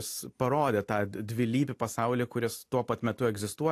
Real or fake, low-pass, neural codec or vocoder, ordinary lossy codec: real; 14.4 kHz; none; AAC, 64 kbps